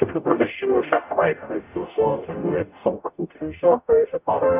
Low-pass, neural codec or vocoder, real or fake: 3.6 kHz; codec, 44.1 kHz, 0.9 kbps, DAC; fake